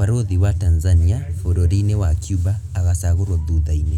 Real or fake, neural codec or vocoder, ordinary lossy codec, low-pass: real; none; none; 19.8 kHz